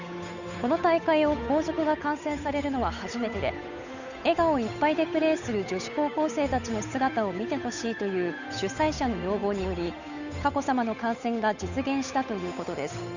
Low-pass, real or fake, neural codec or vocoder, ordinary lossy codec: 7.2 kHz; fake; codec, 16 kHz, 8 kbps, FunCodec, trained on Chinese and English, 25 frames a second; none